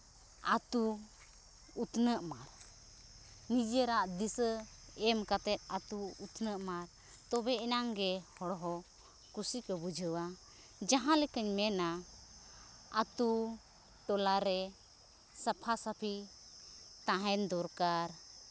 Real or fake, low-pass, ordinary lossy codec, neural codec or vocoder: real; none; none; none